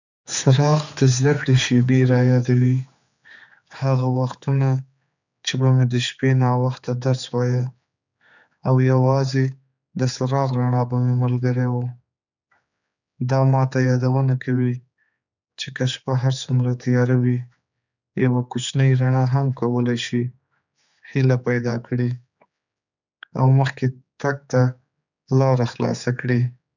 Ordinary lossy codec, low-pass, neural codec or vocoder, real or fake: none; 7.2 kHz; codec, 16 kHz, 4 kbps, X-Codec, HuBERT features, trained on general audio; fake